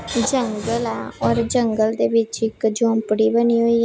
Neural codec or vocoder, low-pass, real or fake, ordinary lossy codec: none; none; real; none